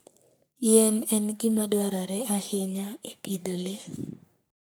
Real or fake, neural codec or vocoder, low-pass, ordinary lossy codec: fake; codec, 44.1 kHz, 3.4 kbps, Pupu-Codec; none; none